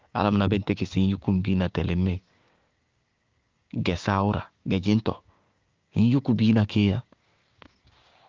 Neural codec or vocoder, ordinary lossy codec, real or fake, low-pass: codec, 16 kHz, 6 kbps, DAC; Opus, 32 kbps; fake; 7.2 kHz